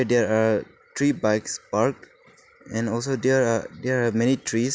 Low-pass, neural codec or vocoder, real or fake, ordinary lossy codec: none; none; real; none